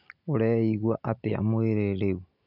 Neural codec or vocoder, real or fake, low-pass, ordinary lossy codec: none; real; 5.4 kHz; none